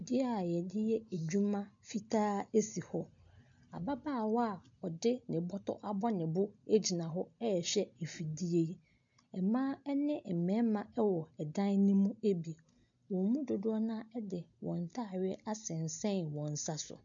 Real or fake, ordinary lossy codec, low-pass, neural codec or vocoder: real; MP3, 64 kbps; 7.2 kHz; none